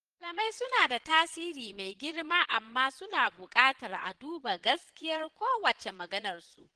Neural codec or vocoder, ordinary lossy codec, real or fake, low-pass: vocoder, 22.05 kHz, 80 mel bands, Vocos; Opus, 16 kbps; fake; 9.9 kHz